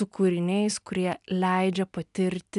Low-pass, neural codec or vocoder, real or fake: 10.8 kHz; none; real